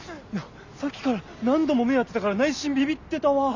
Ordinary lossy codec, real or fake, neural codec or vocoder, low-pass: Opus, 64 kbps; real; none; 7.2 kHz